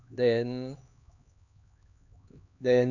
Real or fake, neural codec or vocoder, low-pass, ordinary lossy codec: fake; codec, 16 kHz, 2 kbps, X-Codec, HuBERT features, trained on LibriSpeech; 7.2 kHz; none